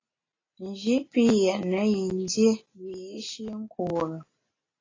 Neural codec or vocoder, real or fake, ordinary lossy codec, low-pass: none; real; AAC, 32 kbps; 7.2 kHz